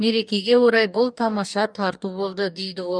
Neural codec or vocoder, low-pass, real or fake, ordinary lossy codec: codec, 44.1 kHz, 2.6 kbps, DAC; 9.9 kHz; fake; none